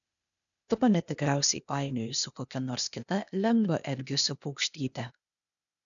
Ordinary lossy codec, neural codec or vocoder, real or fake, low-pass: MP3, 96 kbps; codec, 16 kHz, 0.8 kbps, ZipCodec; fake; 7.2 kHz